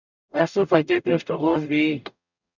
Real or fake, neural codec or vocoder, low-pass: fake; codec, 44.1 kHz, 0.9 kbps, DAC; 7.2 kHz